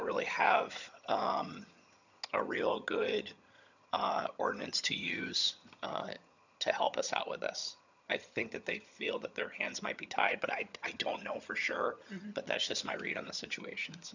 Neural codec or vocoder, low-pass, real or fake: vocoder, 22.05 kHz, 80 mel bands, HiFi-GAN; 7.2 kHz; fake